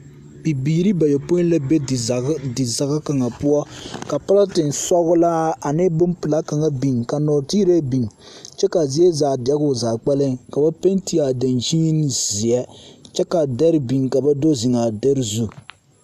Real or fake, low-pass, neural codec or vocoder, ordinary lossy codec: real; 14.4 kHz; none; AAC, 96 kbps